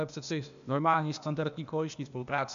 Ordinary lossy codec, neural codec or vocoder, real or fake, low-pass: AAC, 96 kbps; codec, 16 kHz, 0.8 kbps, ZipCodec; fake; 7.2 kHz